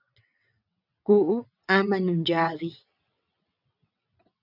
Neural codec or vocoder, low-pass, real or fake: vocoder, 22.05 kHz, 80 mel bands, WaveNeXt; 5.4 kHz; fake